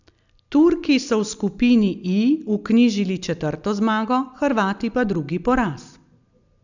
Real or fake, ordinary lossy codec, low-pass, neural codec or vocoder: real; none; 7.2 kHz; none